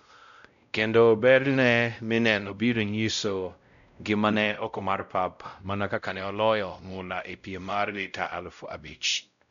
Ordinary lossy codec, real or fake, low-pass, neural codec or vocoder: none; fake; 7.2 kHz; codec, 16 kHz, 0.5 kbps, X-Codec, WavLM features, trained on Multilingual LibriSpeech